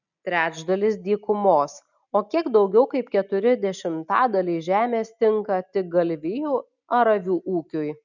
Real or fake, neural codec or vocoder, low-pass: real; none; 7.2 kHz